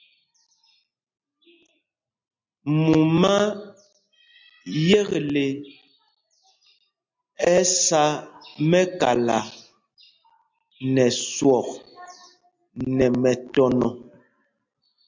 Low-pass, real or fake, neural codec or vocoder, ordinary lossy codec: 7.2 kHz; real; none; MP3, 64 kbps